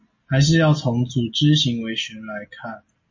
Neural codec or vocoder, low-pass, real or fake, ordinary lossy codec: none; 7.2 kHz; real; MP3, 32 kbps